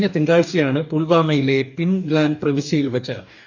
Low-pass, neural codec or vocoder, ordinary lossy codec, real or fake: 7.2 kHz; codec, 44.1 kHz, 2.6 kbps, DAC; none; fake